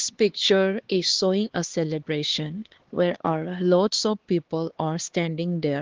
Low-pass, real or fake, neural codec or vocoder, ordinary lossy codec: 7.2 kHz; fake; codec, 16 kHz, 2 kbps, X-Codec, HuBERT features, trained on LibriSpeech; Opus, 16 kbps